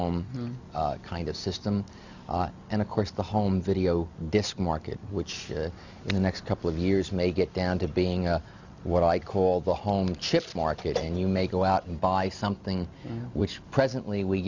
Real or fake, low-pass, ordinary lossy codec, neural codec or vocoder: real; 7.2 kHz; Opus, 64 kbps; none